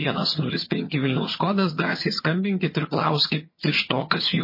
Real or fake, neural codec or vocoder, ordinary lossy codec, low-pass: fake; vocoder, 22.05 kHz, 80 mel bands, HiFi-GAN; MP3, 24 kbps; 5.4 kHz